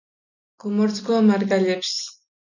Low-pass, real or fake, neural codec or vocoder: 7.2 kHz; real; none